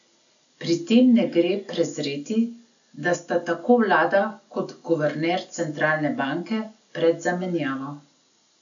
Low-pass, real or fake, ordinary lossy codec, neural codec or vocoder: 7.2 kHz; real; none; none